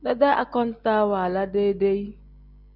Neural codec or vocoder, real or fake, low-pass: none; real; 5.4 kHz